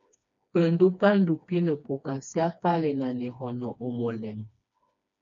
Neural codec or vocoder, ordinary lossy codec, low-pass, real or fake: codec, 16 kHz, 2 kbps, FreqCodec, smaller model; MP3, 64 kbps; 7.2 kHz; fake